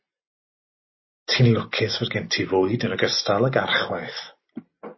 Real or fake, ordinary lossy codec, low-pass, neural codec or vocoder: real; MP3, 24 kbps; 7.2 kHz; none